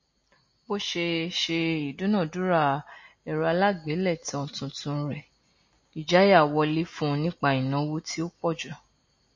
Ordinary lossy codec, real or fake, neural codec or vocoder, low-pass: MP3, 32 kbps; real; none; 7.2 kHz